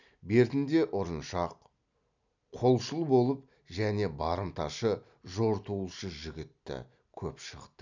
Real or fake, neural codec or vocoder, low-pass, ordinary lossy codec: real; none; 7.2 kHz; none